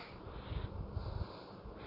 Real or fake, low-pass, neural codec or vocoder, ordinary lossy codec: real; 5.4 kHz; none; none